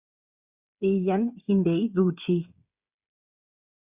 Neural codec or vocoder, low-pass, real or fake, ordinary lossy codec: codec, 16 kHz, 8 kbps, FreqCodec, smaller model; 3.6 kHz; fake; Opus, 64 kbps